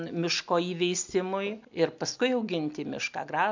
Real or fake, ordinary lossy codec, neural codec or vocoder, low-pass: real; MP3, 64 kbps; none; 7.2 kHz